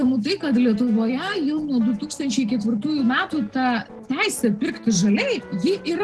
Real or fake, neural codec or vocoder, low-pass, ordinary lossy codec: real; none; 10.8 kHz; Opus, 16 kbps